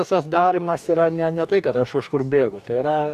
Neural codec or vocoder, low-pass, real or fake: codec, 44.1 kHz, 2.6 kbps, DAC; 14.4 kHz; fake